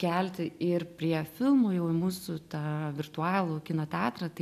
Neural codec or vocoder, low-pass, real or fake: none; 14.4 kHz; real